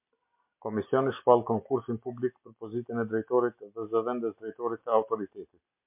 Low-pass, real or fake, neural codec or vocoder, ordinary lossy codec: 3.6 kHz; real; none; MP3, 32 kbps